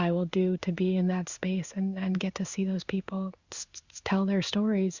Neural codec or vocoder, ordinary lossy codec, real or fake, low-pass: codec, 16 kHz in and 24 kHz out, 1 kbps, XY-Tokenizer; Opus, 64 kbps; fake; 7.2 kHz